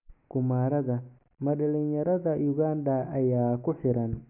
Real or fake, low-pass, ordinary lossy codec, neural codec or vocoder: real; 3.6 kHz; MP3, 32 kbps; none